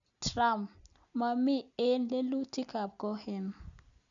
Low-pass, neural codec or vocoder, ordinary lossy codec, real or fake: 7.2 kHz; none; none; real